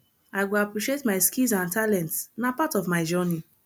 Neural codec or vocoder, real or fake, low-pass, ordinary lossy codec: none; real; none; none